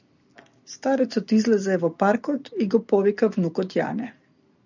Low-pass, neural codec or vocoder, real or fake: 7.2 kHz; none; real